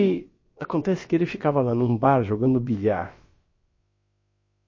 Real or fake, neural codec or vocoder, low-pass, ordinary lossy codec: fake; codec, 16 kHz, about 1 kbps, DyCAST, with the encoder's durations; 7.2 kHz; MP3, 32 kbps